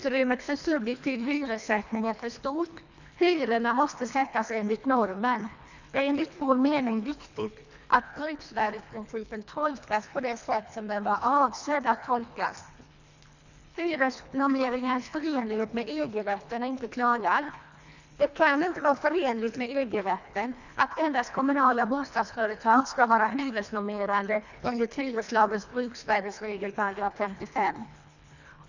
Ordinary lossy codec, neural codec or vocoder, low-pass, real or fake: none; codec, 24 kHz, 1.5 kbps, HILCodec; 7.2 kHz; fake